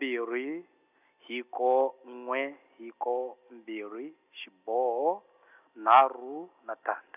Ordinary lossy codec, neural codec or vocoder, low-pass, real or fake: none; none; 3.6 kHz; real